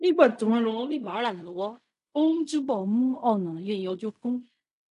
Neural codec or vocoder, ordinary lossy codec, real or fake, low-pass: codec, 16 kHz in and 24 kHz out, 0.4 kbps, LongCat-Audio-Codec, fine tuned four codebook decoder; none; fake; 10.8 kHz